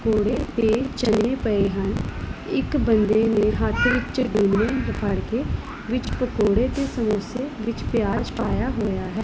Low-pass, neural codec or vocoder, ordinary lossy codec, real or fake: none; none; none; real